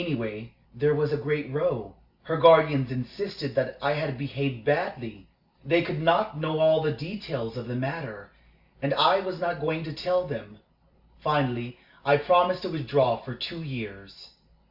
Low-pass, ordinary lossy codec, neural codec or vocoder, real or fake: 5.4 kHz; Opus, 64 kbps; none; real